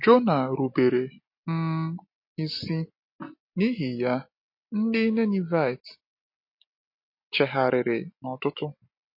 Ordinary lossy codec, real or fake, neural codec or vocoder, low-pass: MP3, 32 kbps; real; none; 5.4 kHz